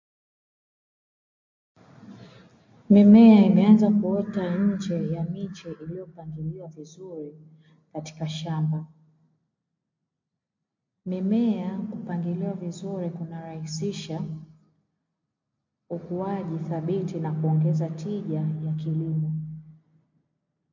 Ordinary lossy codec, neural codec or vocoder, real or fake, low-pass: MP3, 48 kbps; none; real; 7.2 kHz